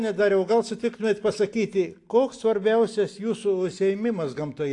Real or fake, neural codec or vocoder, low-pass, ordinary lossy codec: real; none; 10.8 kHz; AAC, 48 kbps